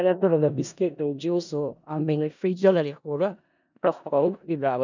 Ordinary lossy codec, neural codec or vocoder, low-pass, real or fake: none; codec, 16 kHz in and 24 kHz out, 0.4 kbps, LongCat-Audio-Codec, four codebook decoder; 7.2 kHz; fake